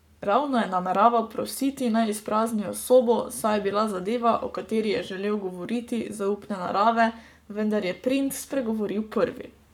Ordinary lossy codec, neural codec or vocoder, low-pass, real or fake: none; codec, 44.1 kHz, 7.8 kbps, Pupu-Codec; 19.8 kHz; fake